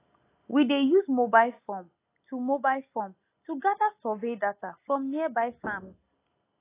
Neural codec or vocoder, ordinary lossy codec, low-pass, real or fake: none; AAC, 24 kbps; 3.6 kHz; real